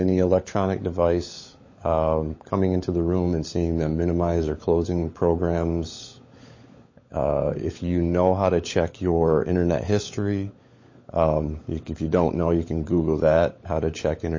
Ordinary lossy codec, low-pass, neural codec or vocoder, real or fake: MP3, 32 kbps; 7.2 kHz; codec, 16 kHz, 16 kbps, FunCodec, trained on LibriTTS, 50 frames a second; fake